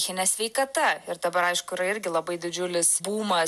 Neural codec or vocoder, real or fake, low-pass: none; real; 14.4 kHz